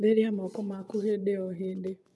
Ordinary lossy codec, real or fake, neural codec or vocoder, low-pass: none; fake; vocoder, 24 kHz, 100 mel bands, Vocos; none